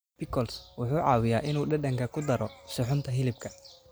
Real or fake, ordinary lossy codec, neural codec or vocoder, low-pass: real; none; none; none